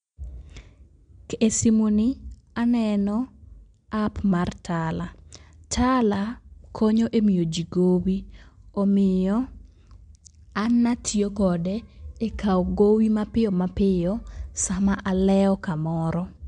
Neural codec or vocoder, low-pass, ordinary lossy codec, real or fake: none; 9.9 kHz; MP3, 64 kbps; real